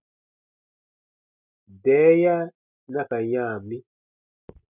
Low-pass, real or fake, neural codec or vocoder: 3.6 kHz; real; none